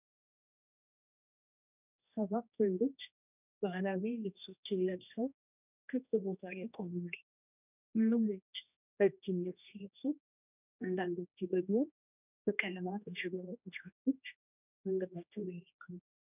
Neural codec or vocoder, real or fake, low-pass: codec, 16 kHz, 1 kbps, X-Codec, HuBERT features, trained on general audio; fake; 3.6 kHz